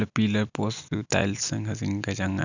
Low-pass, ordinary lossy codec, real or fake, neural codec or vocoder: 7.2 kHz; none; real; none